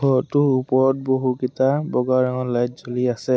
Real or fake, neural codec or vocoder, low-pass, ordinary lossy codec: real; none; none; none